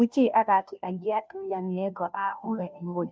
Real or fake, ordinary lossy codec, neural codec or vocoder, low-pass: fake; none; codec, 16 kHz, 0.5 kbps, FunCodec, trained on Chinese and English, 25 frames a second; none